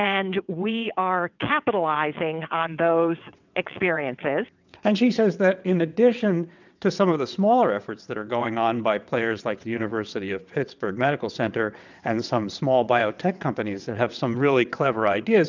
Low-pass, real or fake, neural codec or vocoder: 7.2 kHz; fake; vocoder, 22.05 kHz, 80 mel bands, WaveNeXt